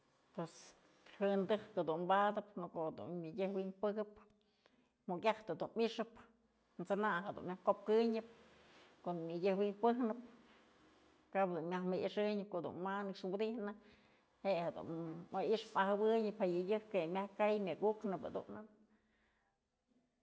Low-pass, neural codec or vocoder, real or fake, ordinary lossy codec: none; none; real; none